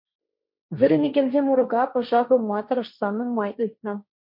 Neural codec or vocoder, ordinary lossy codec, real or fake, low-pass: codec, 16 kHz, 1.1 kbps, Voila-Tokenizer; MP3, 32 kbps; fake; 5.4 kHz